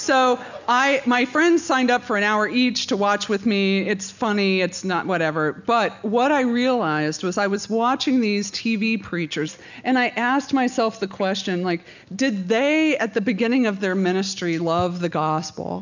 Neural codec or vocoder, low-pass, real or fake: none; 7.2 kHz; real